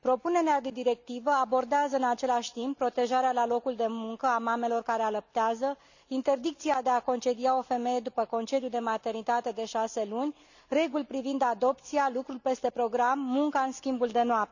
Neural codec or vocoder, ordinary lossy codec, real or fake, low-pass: none; none; real; 7.2 kHz